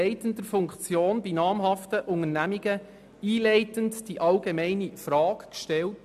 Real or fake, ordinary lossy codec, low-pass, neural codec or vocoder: real; none; 14.4 kHz; none